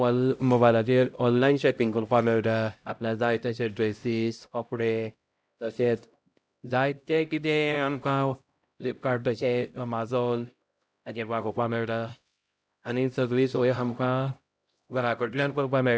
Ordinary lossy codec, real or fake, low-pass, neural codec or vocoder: none; fake; none; codec, 16 kHz, 0.5 kbps, X-Codec, HuBERT features, trained on LibriSpeech